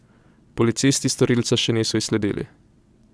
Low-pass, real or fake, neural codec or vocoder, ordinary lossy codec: none; fake; vocoder, 22.05 kHz, 80 mel bands, WaveNeXt; none